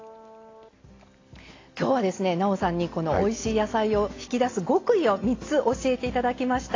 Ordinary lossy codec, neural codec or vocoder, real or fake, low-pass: AAC, 32 kbps; none; real; 7.2 kHz